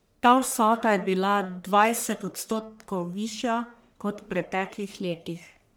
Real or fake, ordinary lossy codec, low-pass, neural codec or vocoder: fake; none; none; codec, 44.1 kHz, 1.7 kbps, Pupu-Codec